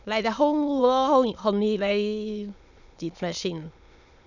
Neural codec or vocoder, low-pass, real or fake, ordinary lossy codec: autoencoder, 22.05 kHz, a latent of 192 numbers a frame, VITS, trained on many speakers; 7.2 kHz; fake; none